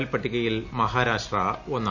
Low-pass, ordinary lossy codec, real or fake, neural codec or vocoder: 7.2 kHz; none; real; none